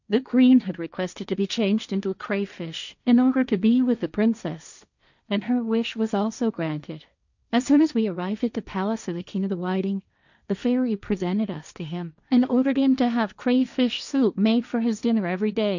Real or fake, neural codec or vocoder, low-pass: fake; codec, 16 kHz, 1.1 kbps, Voila-Tokenizer; 7.2 kHz